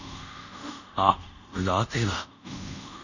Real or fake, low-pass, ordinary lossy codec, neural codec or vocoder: fake; 7.2 kHz; none; codec, 24 kHz, 0.5 kbps, DualCodec